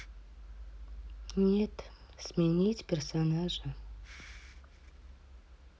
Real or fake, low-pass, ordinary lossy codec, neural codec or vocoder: real; none; none; none